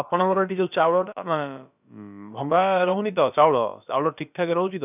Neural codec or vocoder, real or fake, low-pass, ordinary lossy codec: codec, 16 kHz, about 1 kbps, DyCAST, with the encoder's durations; fake; 3.6 kHz; none